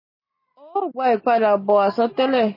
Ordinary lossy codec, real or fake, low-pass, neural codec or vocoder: MP3, 24 kbps; real; 5.4 kHz; none